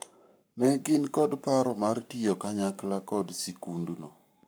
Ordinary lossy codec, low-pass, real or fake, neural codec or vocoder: none; none; fake; codec, 44.1 kHz, 7.8 kbps, Pupu-Codec